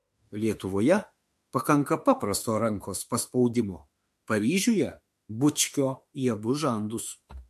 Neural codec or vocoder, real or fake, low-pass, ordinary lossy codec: autoencoder, 48 kHz, 32 numbers a frame, DAC-VAE, trained on Japanese speech; fake; 14.4 kHz; MP3, 64 kbps